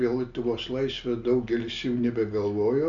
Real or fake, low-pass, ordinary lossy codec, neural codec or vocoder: real; 7.2 kHz; MP3, 64 kbps; none